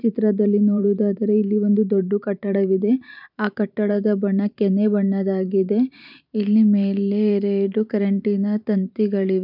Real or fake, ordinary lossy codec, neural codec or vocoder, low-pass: fake; none; vocoder, 44.1 kHz, 128 mel bands every 512 samples, BigVGAN v2; 5.4 kHz